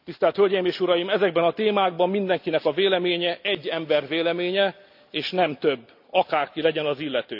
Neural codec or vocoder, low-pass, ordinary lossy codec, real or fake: none; 5.4 kHz; none; real